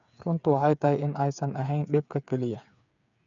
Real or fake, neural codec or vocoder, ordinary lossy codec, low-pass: fake; codec, 16 kHz, 8 kbps, FreqCodec, smaller model; none; 7.2 kHz